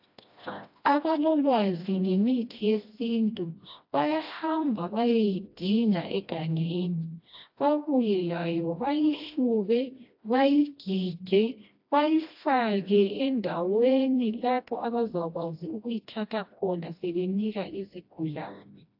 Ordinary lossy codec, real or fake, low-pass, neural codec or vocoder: AAC, 48 kbps; fake; 5.4 kHz; codec, 16 kHz, 1 kbps, FreqCodec, smaller model